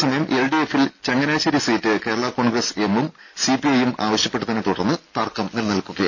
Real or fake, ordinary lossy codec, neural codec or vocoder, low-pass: real; MP3, 64 kbps; none; 7.2 kHz